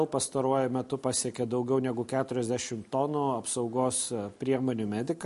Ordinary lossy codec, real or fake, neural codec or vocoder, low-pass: MP3, 48 kbps; real; none; 14.4 kHz